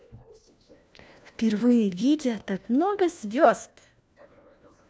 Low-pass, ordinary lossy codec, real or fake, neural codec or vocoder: none; none; fake; codec, 16 kHz, 1 kbps, FunCodec, trained on LibriTTS, 50 frames a second